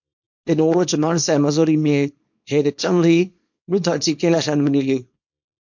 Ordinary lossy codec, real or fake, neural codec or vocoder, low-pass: MP3, 48 kbps; fake; codec, 24 kHz, 0.9 kbps, WavTokenizer, small release; 7.2 kHz